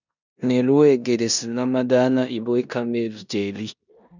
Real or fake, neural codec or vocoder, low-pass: fake; codec, 16 kHz in and 24 kHz out, 0.9 kbps, LongCat-Audio-Codec, four codebook decoder; 7.2 kHz